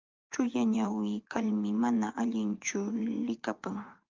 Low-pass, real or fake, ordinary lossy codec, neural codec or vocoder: 7.2 kHz; fake; Opus, 24 kbps; vocoder, 24 kHz, 100 mel bands, Vocos